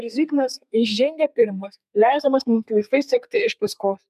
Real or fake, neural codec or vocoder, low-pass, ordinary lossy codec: fake; codec, 32 kHz, 1.9 kbps, SNAC; 14.4 kHz; MP3, 96 kbps